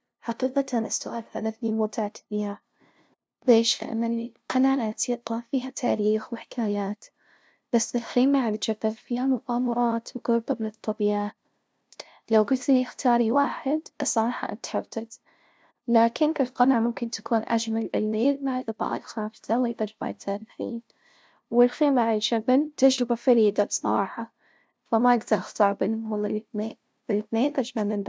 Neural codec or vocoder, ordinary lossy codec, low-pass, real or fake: codec, 16 kHz, 0.5 kbps, FunCodec, trained on LibriTTS, 25 frames a second; none; none; fake